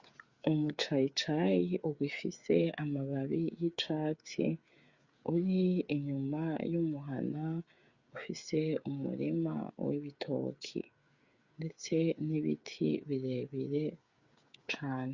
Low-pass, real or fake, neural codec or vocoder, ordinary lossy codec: 7.2 kHz; fake; codec, 16 kHz, 8 kbps, FreqCodec, smaller model; Opus, 64 kbps